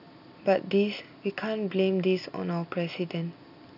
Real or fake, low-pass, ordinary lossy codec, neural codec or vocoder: real; 5.4 kHz; none; none